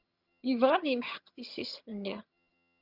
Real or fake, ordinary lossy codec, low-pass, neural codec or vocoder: fake; Opus, 64 kbps; 5.4 kHz; vocoder, 22.05 kHz, 80 mel bands, HiFi-GAN